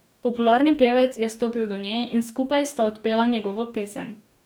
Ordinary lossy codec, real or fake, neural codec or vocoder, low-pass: none; fake; codec, 44.1 kHz, 2.6 kbps, DAC; none